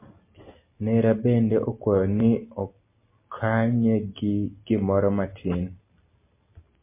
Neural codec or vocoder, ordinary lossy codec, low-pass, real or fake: none; MP3, 24 kbps; 3.6 kHz; real